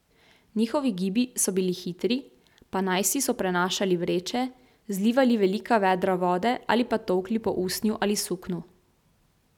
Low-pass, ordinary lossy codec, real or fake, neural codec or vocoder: 19.8 kHz; none; real; none